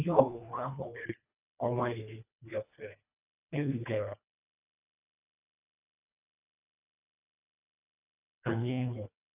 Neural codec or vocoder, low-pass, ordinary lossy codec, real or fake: codec, 24 kHz, 1.5 kbps, HILCodec; 3.6 kHz; none; fake